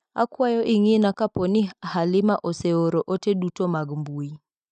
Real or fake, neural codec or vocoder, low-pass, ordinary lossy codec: real; none; 9.9 kHz; none